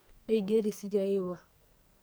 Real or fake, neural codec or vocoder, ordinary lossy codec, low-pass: fake; codec, 44.1 kHz, 2.6 kbps, SNAC; none; none